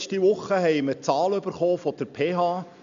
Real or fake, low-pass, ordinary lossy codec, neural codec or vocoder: real; 7.2 kHz; MP3, 64 kbps; none